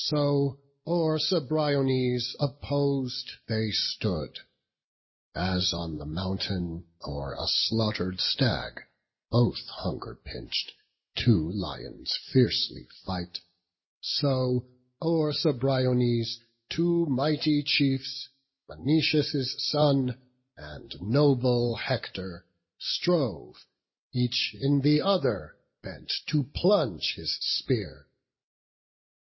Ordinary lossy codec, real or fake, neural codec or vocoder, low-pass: MP3, 24 kbps; real; none; 7.2 kHz